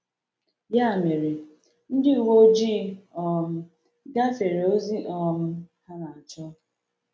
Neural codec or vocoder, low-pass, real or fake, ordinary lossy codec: none; none; real; none